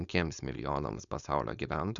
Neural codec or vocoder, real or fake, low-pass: codec, 16 kHz, 4.8 kbps, FACodec; fake; 7.2 kHz